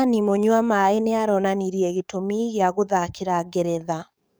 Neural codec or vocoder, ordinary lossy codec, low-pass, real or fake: codec, 44.1 kHz, 7.8 kbps, DAC; none; none; fake